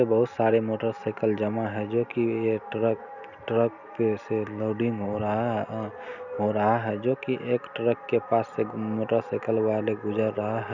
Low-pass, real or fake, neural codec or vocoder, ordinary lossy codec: 7.2 kHz; real; none; none